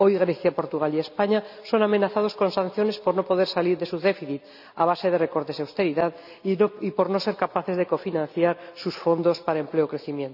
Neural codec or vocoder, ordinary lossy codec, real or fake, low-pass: none; none; real; 5.4 kHz